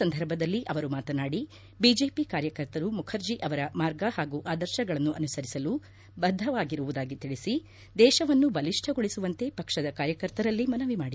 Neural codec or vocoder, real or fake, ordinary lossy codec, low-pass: none; real; none; none